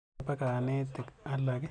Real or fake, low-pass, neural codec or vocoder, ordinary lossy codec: real; 9.9 kHz; none; none